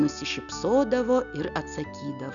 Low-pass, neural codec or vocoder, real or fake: 7.2 kHz; none; real